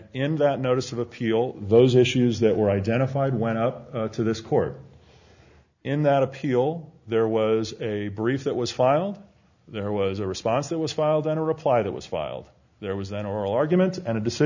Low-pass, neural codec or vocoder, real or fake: 7.2 kHz; none; real